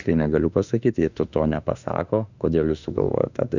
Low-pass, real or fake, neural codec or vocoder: 7.2 kHz; fake; autoencoder, 48 kHz, 32 numbers a frame, DAC-VAE, trained on Japanese speech